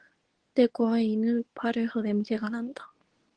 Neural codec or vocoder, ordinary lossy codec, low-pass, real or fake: codec, 24 kHz, 0.9 kbps, WavTokenizer, medium speech release version 2; Opus, 16 kbps; 9.9 kHz; fake